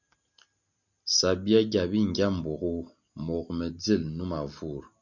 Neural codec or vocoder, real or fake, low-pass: none; real; 7.2 kHz